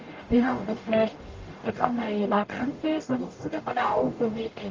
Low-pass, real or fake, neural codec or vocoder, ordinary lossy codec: 7.2 kHz; fake; codec, 44.1 kHz, 0.9 kbps, DAC; Opus, 24 kbps